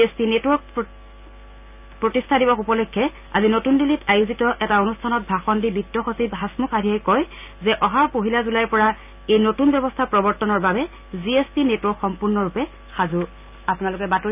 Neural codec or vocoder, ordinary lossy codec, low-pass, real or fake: none; none; 3.6 kHz; real